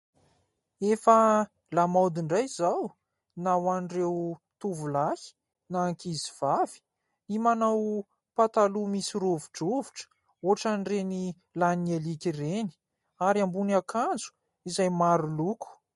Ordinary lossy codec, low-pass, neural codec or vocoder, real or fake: MP3, 48 kbps; 19.8 kHz; none; real